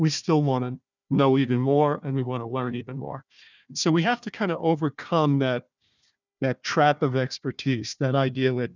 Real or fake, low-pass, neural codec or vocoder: fake; 7.2 kHz; codec, 16 kHz, 1 kbps, FunCodec, trained on Chinese and English, 50 frames a second